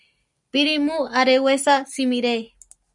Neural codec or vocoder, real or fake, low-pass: none; real; 10.8 kHz